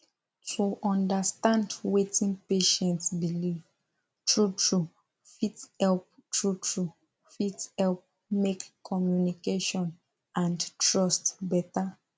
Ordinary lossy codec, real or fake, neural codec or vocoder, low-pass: none; real; none; none